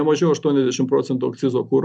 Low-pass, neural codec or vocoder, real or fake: 9.9 kHz; none; real